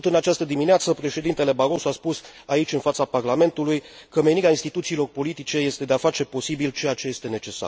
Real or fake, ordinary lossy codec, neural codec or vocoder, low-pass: real; none; none; none